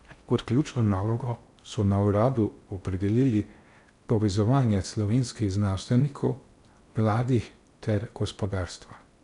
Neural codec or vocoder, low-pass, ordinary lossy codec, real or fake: codec, 16 kHz in and 24 kHz out, 0.6 kbps, FocalCodec, streaming, 4096 codes; 10.8 kHz; none; fake